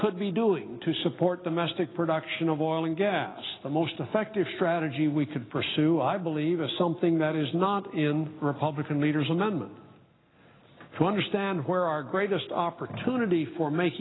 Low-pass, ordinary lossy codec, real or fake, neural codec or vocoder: 7.2 kHz; AAC, 16 kbps; real; none